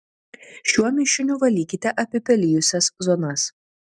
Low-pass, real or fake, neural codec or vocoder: 9.9 kHz; real; none